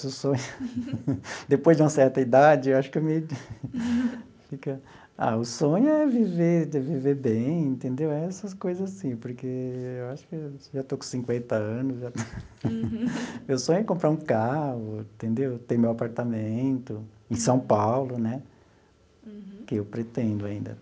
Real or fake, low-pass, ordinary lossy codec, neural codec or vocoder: real; none; none; none